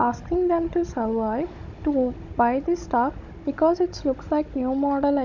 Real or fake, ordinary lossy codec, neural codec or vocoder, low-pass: fake; none; codec, 16 kHz, 16 kbps, FunCodec, trained on Chinese and English, 50 frames a second; 7.2 kHz